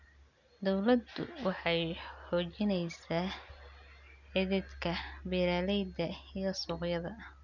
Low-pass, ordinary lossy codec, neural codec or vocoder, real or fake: 7.2 kHz; none; none; real